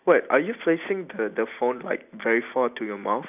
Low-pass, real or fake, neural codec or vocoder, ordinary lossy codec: 3.6 kHz; real; none; none